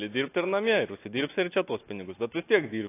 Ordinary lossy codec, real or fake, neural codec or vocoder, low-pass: AAC, 24 kbps; real; none; 3.6 kHz